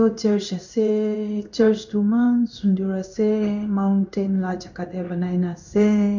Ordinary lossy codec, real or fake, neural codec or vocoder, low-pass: none; fake; codec, 16 kHz in and 24 kHz out, 1 kbps, XY-Tokenizer; 7.2 kHz